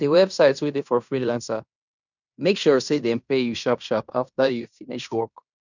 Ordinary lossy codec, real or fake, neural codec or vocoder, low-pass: none; fake; codec, 16 kHz in and 24 kHz out, 0.9 kbps, LongCat-Audio-Codec, fine tuned four codebook decoder; 7.2 kHz